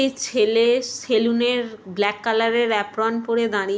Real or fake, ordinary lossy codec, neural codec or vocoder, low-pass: real; none; none; none